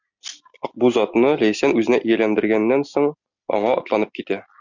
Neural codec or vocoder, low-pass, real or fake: none; 7.2 kHz; real